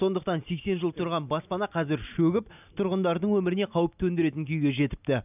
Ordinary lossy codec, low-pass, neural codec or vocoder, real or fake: none; 3.6 kHz; none; real